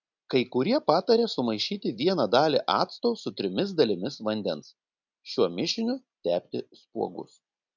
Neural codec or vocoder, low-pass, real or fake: none; 7.2 kHz; real